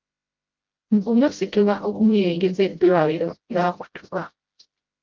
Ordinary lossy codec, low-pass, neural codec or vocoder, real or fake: Opus, 24 kbps; 7.2 kHz; codec, 16 kHz, 0.5 kbps, FreqCodec, smaller model; fake